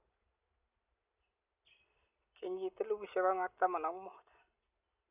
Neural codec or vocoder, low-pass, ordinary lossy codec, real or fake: none; 3.6 kHz; none; real